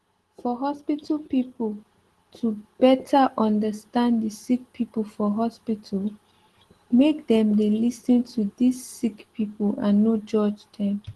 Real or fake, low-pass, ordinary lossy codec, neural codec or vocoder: real; 10.8 kHz; Opus, 16 kbps; none